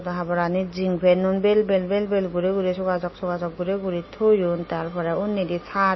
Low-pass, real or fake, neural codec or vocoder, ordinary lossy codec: 7.2 kHz; real; none; MP3, 24 kbps